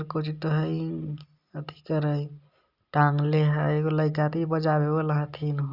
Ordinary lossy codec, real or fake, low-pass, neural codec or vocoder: Opus, 64 kbps; real; 5.4 kHz; none